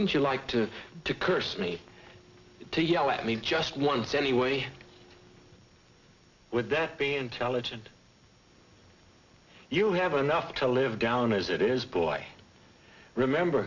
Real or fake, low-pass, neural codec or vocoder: real; 7.2 kHz; none